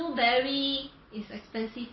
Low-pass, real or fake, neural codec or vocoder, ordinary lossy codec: 7.2 kHz; real; none; MP3, 24 kbps